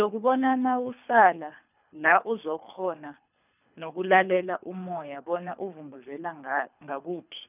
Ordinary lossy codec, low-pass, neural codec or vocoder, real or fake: none; 3.6 kHz; codec, 24 kHz, 3 kbps, HILCodec; fake